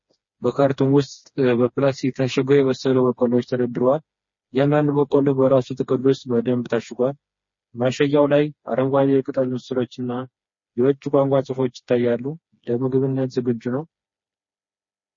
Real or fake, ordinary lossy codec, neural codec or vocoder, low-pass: fake; MP3, 32 kbps; codec, 16 kHz, 2 kbps, FreqCodec, smaller model; 7.2 kHz